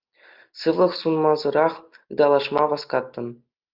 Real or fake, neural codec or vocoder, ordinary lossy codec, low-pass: real; none; Opus, 24 kbps; 5.4 kHz